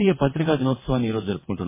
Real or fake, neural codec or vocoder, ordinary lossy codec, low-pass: fake; vocoder, 22.05 kHz, 80 mel bands, WaveNeXt; MP3, 16 kbps; 3.6 kHz